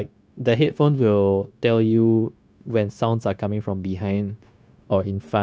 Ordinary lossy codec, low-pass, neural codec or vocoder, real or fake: none; none; codec, 16 kHz, 0.9 kbps, LongCat-Audio-Codec; fake